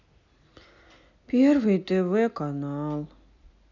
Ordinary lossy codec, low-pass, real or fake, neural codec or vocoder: none; 7.2 kHz; real; none